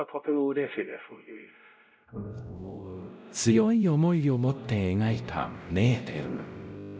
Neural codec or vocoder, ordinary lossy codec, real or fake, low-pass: codec, 16 kHz, 0.5 kbps, X-Codec, WavLM features, trained on Multilingual LibriSpeech; none; fake; none